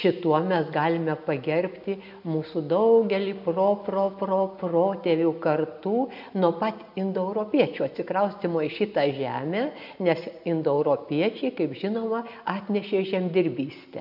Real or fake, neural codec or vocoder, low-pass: real; none; 5.4 kHz